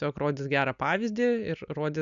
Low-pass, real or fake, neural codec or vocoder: 7.2 kHz; real; none